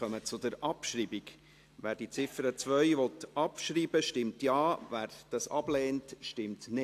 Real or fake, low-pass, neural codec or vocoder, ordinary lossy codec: real; 14.4 kHz; none; AAC, 64 kbps